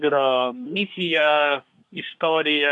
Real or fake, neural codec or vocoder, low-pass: fake; codec, 24 kHz, 1 kbps, SNAC; 10.8 kHz